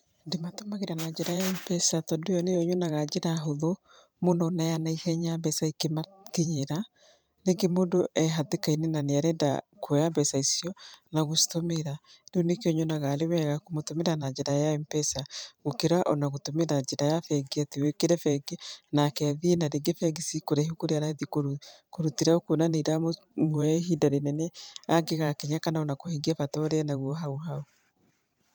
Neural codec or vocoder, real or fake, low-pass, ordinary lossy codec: vocoder, 44.1 kHz, 128 mel bands every 512 samples, BigVGAN v2; fake; none; none